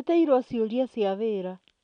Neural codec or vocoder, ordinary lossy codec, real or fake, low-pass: none; MP3, 64 kbps; real; 9.9 kHz